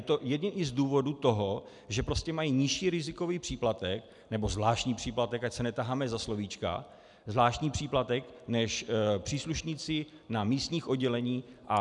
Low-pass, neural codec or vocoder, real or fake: 10.8 kHz; none; real